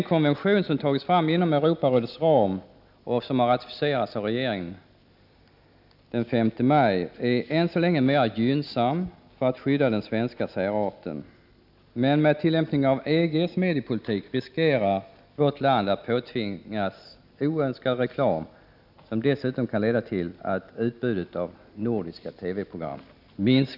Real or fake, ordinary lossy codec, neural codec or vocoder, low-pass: real; none; none; 5.4 kHz